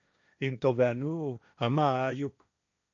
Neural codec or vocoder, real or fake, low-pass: codec, 16 kHz, 1.1 kbps, Voila-Tokenizer; fake; 7.2 kHz